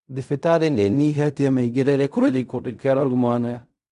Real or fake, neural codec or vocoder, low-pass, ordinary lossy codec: fake; codec, 16 kHz in and 24 kHz out, 0.4 kbps, LongCat-Audio-Codec, fine tuned four codebook decoder; 10.8 kHz; none